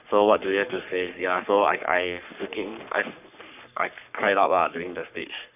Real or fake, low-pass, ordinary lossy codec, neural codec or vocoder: fake; 3.6 kHz; none; codec, 44.1 kHz, 3.4 kbps, Pupu-Codec